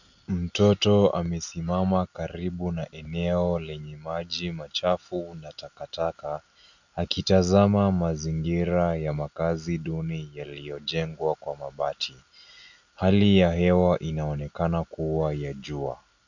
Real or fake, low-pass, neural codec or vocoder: real; 7.2 kHz; none